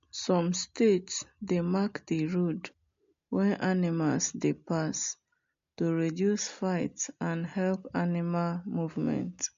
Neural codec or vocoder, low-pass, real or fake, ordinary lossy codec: none; 7.2 kHz; real; MP3, 48 kbps